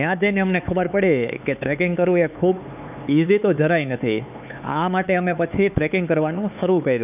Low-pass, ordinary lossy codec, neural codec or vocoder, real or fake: 3.6 kHz; none; codec, 16 kHz, 4 kbps, X-Codec, HuBERT features, trained on LibriSpeech; fake